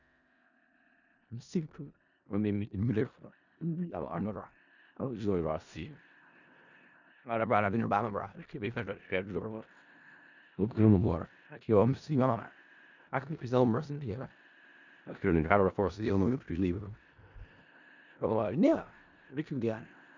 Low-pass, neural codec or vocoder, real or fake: 7.2 kHz; codec, 16 kHz in and 24 kHz out, 0.4 kbps, LongCat-Audio-Codec, four codebook decoder; fake